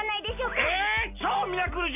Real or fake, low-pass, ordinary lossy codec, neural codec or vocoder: real; 3.6 kHz; none; none